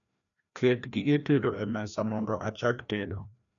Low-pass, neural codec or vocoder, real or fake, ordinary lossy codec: 7.2 kHz; codec, 16 kHz, 1 kbps, FreqCodec, larger model; fake; none